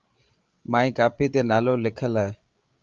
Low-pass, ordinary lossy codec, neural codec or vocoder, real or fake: 7.2 kHz; Opus, 32 kbps; codec, 16 kHz, 8 kbps, FreqCodec, larger model; fake